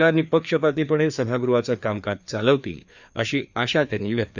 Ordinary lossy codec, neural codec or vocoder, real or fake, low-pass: none; codec, 16 kHz, 2 kbps, FreqCodec, larger model; fake; 7.2 kHz